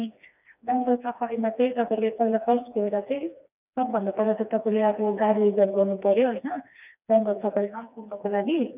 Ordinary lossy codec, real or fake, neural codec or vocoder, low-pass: none; fake; codec, 16 kHz, 2 kbps, FreqCodec, smaller model; 3.6 kHz